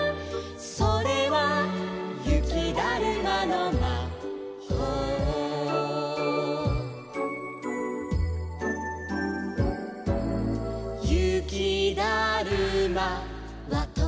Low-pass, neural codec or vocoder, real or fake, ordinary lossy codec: none; none; real; none